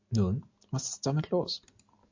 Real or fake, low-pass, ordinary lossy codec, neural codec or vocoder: real; 7.2 kHz; MP3, 48 kbps; none